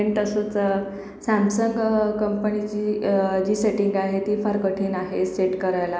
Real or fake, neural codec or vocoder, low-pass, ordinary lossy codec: real; none; none; none